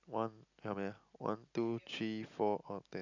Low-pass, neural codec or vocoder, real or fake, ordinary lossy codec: 7.2 kHz; none; real; none